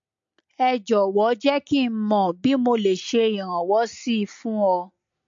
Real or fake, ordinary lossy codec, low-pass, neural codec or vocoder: real; MP3, 48 kbps; 7.2 kHz; none